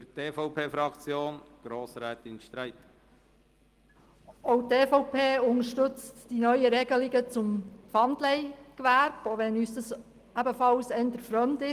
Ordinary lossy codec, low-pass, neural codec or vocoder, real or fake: Opus, 24 kbps; 14.4 kHz; none; real